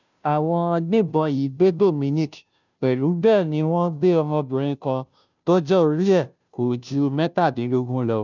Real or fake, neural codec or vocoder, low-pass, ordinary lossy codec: fake; codec, 16 kHz, 0.5 kbps, FunCodec, trained on Chinese and English, 25 frames a second; 7.2 kHz; none